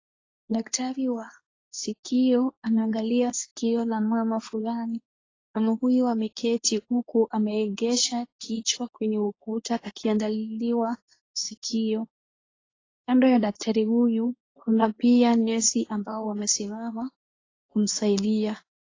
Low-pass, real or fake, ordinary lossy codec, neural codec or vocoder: 7.2 kHz; fake; AAC, 32 kbps; codec, 24 kHz, 0.9 kbps, WavTokenizer, medium speech release version 2